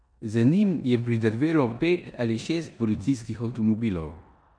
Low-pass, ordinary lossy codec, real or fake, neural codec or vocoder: 9.9 kHz; none; fake; codec, 16 kHz in and 24 kHz out, 0.9 kbps, LongCat-Audio-Codec, four codebook decoder